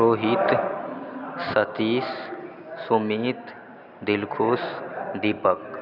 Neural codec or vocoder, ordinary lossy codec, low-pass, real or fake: none; none; 5.4 kHz; real